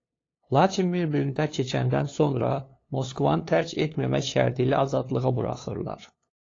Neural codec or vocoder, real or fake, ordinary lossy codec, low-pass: codec, 16 kHz, 2 kbps, FunCodec, trained on LibriTTS, 25 frames a second; fake; AAC, 32 kbps; 7.2 kHz